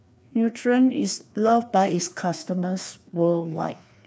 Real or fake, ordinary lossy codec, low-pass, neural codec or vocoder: fake; none; none; codec, 16 kHz, 2 kbps, FreqCodec, larger model